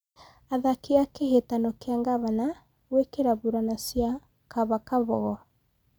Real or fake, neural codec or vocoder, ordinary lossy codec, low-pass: real; none; none; none